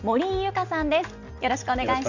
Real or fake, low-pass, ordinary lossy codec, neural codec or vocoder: real; 7.2 kHz; none; none